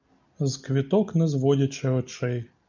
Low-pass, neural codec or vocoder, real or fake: 7.2 kHz; none; real